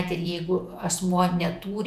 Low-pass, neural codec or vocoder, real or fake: 14.4 kHz; vocoder, 48 kHz, 128 mel bands, Vocos; fake